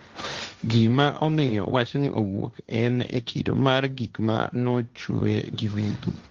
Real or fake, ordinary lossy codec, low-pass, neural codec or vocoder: fake; Opus, 32 kbps; 7.2 kHz; codec, 16 kHz, 1.1 kbps, Voila-Tokenizer